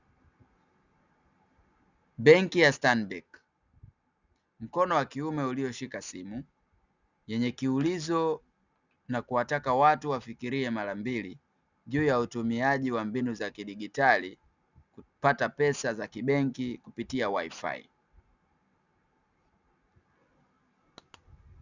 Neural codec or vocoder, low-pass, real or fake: none; 7.2 kHz; real